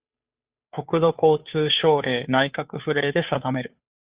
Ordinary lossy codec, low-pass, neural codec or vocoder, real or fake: Opus, 64 kbps; 3.6 kHz; codec, 16 kHz, 2 kbps, FunCodec, trained on Chinese and English, 25 frames a second; fake